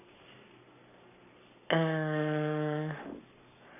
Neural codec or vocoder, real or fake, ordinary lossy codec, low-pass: codec, 44.1 kHz, 7.8 kbps, Pupu-Codec; fake; none; 3.6 kHz